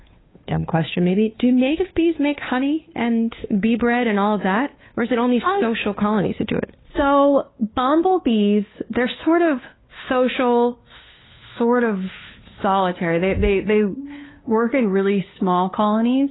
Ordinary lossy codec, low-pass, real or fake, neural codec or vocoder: AAC, 16 kbps; 7.2 kHz; fake; codec, 16 kHz, 2 kbps, FunCodec, trained on Chinese and English, 25 frames a second